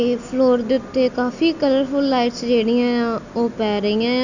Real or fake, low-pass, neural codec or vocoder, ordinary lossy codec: real; 7.2 kHz; none; none